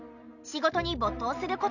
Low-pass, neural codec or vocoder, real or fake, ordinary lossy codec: 7.2 kHz; none; real; none